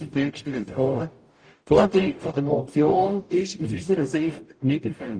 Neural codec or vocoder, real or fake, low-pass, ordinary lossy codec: codec, 44.1 kHz, 0.9 kbps, DAC; fake; 9.9 kHz; Opus, 32 kbps